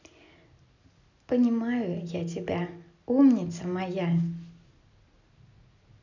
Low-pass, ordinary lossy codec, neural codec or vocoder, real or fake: 7.2 kHz; none; none; real